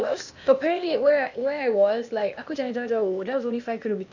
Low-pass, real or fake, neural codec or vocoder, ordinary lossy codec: 7.2 kHz; fake; codec, 16 kHz, 0.8 kbps, ZipCodec; none